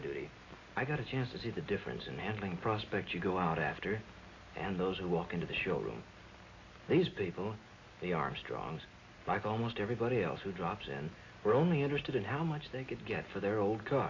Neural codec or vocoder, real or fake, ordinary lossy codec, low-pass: none; real; AAC, 32 kbps; 7.2 kHz